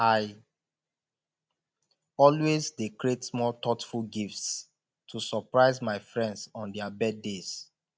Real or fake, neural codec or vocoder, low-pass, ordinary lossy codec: real; none; none; none